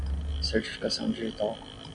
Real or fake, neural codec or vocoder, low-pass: real; none; 9.9 kHz